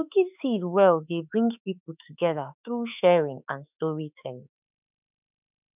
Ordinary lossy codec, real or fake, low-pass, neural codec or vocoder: none; fake; 3.6 kHz; autoencoder, 48 kHz, 32 numbers a frame, DAC-VAE, trained on Japanese speech